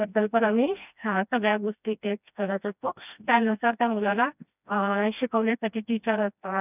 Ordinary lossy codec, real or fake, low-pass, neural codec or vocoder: none; fake; 3.6 kHz; codec, 16 kHz, 1 kbps, FreqCodec, smaller model